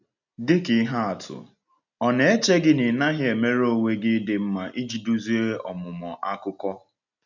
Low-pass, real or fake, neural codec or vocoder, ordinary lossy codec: 7.2 kHz; real; none; none